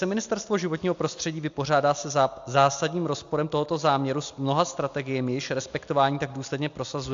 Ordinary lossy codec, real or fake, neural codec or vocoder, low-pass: AAC, 64 kbps; real; none; 7.2 kHz